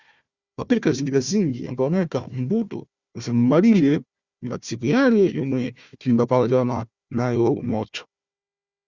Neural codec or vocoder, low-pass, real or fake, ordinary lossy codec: codec, 16 kHz, 1 kbps, FunCodec, trained on Chinese and English, 50 frames a second; 7.2 kHz; fake; Opus, 64 kbps